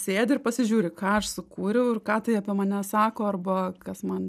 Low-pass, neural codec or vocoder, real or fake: 14.4 kHz; none; real